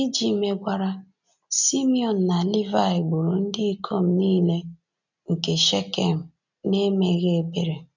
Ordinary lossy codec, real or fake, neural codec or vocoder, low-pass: none; real; none; 7.2 kHz